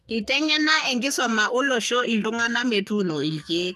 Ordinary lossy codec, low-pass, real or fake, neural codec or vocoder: none; 14.4 kHz; fake; codec, 44.1 kHz, 2.6 kbps, SNAC